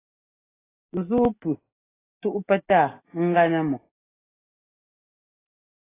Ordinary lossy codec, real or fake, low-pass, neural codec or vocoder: AAC, 16 kbps; real; 3.6 kHz; none